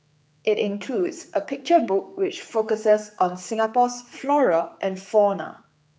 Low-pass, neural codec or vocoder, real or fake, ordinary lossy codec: none; codec, 16 kHz, 4 kbps, X-Codec, HuBERT features, trained on general audio; fake; none